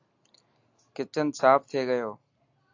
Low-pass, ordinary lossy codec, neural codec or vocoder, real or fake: 7.2 kHz; AAC, 48 kbps; none; real